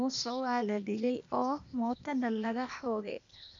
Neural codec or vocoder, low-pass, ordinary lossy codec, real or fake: codec, 16 kHz, 0.8 kbps, ZipCodec; 7.2 kHz; none; fake